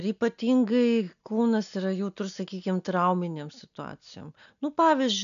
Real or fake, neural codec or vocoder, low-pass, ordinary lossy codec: real; none; 7.2 kHz; MP3, 96 kbps